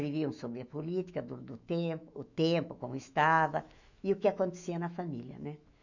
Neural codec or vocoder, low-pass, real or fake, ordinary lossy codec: autoencoder, 48 kHz, 128 numbers a frame, DAC-VAE, trained on Japanese speech; 7.2 kHz; fake; none